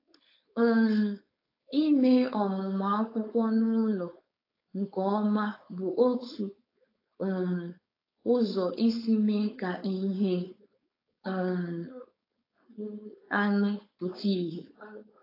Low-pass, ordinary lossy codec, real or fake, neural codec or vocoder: 5.4 kHz; AAC, 24 kbps; fake; codec, 16 kHz, 4.8 kbps, FACodec